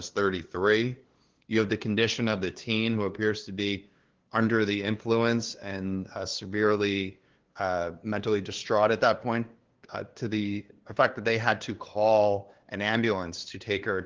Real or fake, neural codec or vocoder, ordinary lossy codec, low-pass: fake; codec, 16 kHz, 2 kbps, FunCodec, trained on LibriTTS, 25 frames a second; Opus, 16 kbps; 7.2 kHz